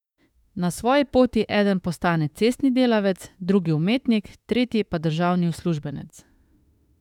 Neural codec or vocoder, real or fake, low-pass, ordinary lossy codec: autoencoder, 48 kHz, 32 numbers a frame, DAC-VAE, trained on Japanese speech; fake; 19.8 kHz; none